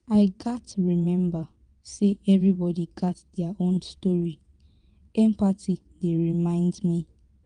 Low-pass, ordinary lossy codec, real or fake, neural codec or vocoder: 9.9 kHz; Opus, 32 kbps; fake; vocoder, 22.05 kHz, 80 mel bands, WaveNeXt